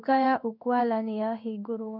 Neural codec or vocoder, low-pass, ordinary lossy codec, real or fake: codec, 16 kHz in and 24 kHz out, 1 kbps, XY-Tokenizer; 5.4 kHz; none; fake